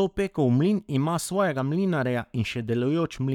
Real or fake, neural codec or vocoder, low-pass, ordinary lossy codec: fake; codec, 44.1 kHz, 7.8 kbps, Pupu-Codec; 19.8 kHz; none